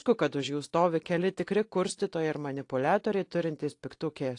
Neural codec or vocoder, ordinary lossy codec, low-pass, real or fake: none; AAC, 48 kbps; 10.8 kHz; real